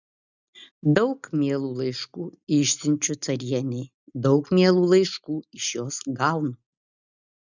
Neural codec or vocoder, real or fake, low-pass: none; real; 7.2 kHz